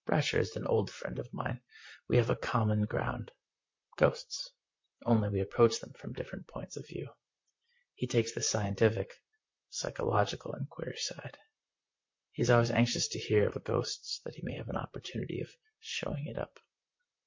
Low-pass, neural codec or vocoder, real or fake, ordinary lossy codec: 7.2 kHz; vocoder, 44.1 kHz, 128 mel bands, Pupu-Vocoder; fake; MP3, 48 kbps